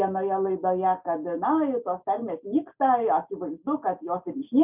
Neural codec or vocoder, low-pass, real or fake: none; 3.6 kHz; real